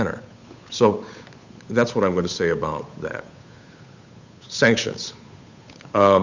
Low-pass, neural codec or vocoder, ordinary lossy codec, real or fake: 7.2 kHz; codec, 16 kHz, 8 kbps, FunCodec, trained on Chinese and English, 25 frames a second; Opus, 64 kbps; fake